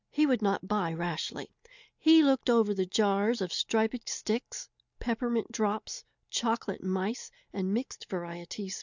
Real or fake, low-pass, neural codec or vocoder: real; 7.2 kHz; none